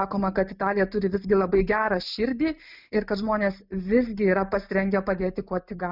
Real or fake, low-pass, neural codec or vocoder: real; 5.4 kHz; none